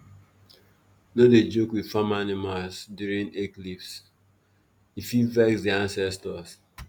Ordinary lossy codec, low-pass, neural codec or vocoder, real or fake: none; none; none; real